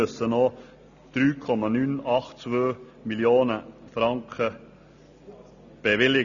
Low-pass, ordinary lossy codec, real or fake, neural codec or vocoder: 7.2 kHz; none; real; none